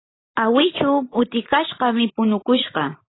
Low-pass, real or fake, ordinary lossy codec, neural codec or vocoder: 7.2 kHz; real; AAC, 16 kbps; none